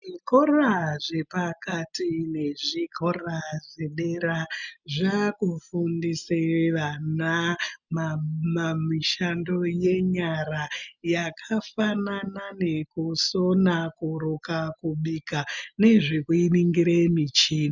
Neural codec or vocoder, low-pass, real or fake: none; 7.2 kHz; real